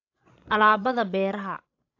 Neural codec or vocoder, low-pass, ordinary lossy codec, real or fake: codec, 16 kHz, 16 kbps, FreqCodec, larger model; 7.2 kHz; AAC, 48 kbps; fake